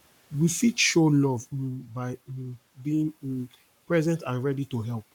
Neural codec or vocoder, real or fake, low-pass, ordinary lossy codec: codec, 44.1 kHz, 7.8 kbps, Pupu-Codec; fake; 19.8 kHz; none